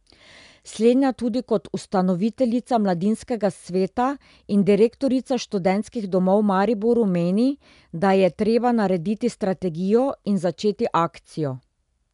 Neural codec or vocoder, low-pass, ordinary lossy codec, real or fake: none; 10.8 kHz; MP3, 96 kbps; real